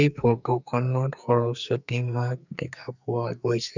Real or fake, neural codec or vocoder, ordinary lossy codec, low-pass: fake; codec, 44.1 kHz, 2.6 kbps, SNAC; none; 7.2 kHz